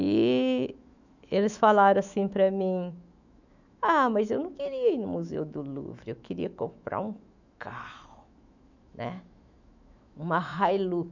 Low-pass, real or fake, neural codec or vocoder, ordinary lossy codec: 7.2 kHz; fake; autoencoder, 48 kHz, 128 numbers a frame, DAC-VAE, trained on Japanese speech; none